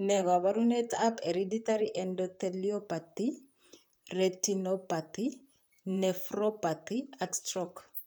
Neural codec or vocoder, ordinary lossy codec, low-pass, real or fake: vocoder, 44.1 kHz, 128 mel bands, Pupu-Vocoder; none; none; fake